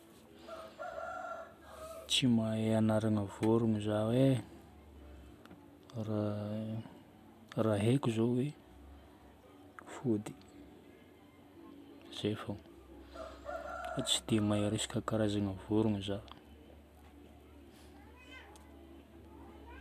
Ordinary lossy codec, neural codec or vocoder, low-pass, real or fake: none; none; 14.4 kHz; real